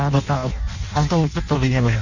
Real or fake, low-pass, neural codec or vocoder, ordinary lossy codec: fake; 7.2 kHz; codec, 16 kHz in and 24 kHz out, 0.6 kbps, FireRedTTS-2 codec; none